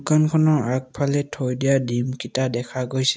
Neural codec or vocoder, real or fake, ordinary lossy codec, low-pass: none; real; none; none